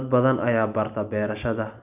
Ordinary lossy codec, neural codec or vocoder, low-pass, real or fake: none; none; 3.6 kHz; real